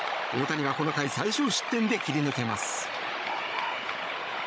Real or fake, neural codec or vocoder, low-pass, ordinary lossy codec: fake; codec, 16 kHz, 16 kbps, FunCodec, trained on Chinese and English, 50 frames a second; none; none